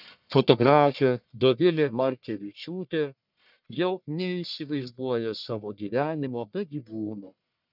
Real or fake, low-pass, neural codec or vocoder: fake; 5.4 kHz; codec, 44.1 kHz, 1.7 kbps, Pupu-Codec